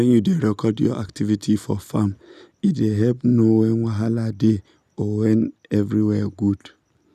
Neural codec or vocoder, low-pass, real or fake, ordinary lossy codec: none; 14.4 kHz; real; none